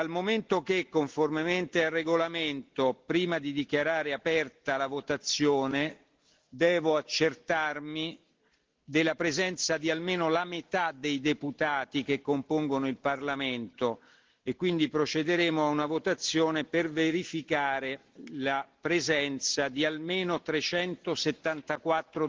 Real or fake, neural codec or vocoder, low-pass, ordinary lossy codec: real; none; 7.2 kHz; Opus, 16 kbps